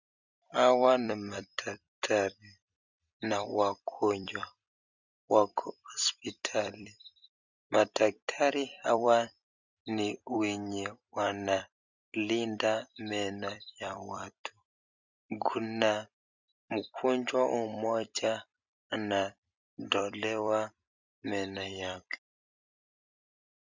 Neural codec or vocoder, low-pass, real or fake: vocoder, 44.1 kHz, 128 mel bands every 256 samples, BigVGAN v2; 7.2 kHz; fake